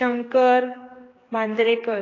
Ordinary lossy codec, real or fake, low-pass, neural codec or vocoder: AAC, 32 kbps; fake; 7.2 kHz; codec, 16 kHz, 2 kbps, X-Codec, HuBERT features, trained on general audio